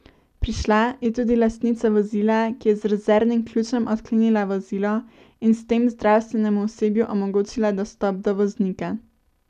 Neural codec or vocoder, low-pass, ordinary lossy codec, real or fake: none; 14.4 kHz; none; real